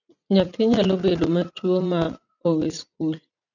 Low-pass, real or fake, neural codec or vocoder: 7.2 kHz; fake; vocoder, 44.1 kHz, 80 mel bands, Vocos